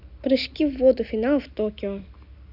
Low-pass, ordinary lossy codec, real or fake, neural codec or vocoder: 5.4 kHz; none; real; none